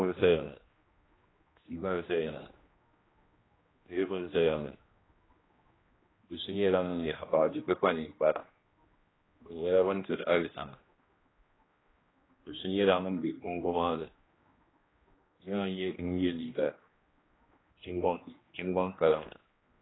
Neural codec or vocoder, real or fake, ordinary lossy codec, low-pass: codec, 16 kHz, 1 kbps, X-Codec, HuBERT features, trained on general audio; fake; AAC, 16 kbps; 7.2 kHz